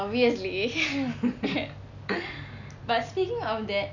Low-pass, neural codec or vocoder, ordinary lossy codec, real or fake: 7.2 kHz; none; none; real